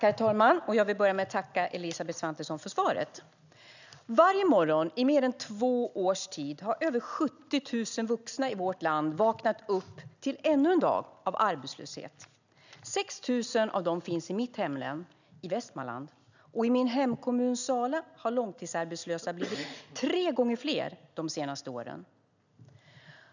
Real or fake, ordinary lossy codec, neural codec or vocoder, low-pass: real; none; none; 7.2 kHz